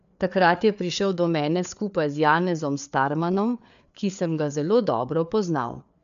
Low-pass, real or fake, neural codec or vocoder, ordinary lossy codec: 7.2 kHz; fake; codec, 16 kHz, 4 kbps, FreqCodec, larger model; AAC, 96 kbps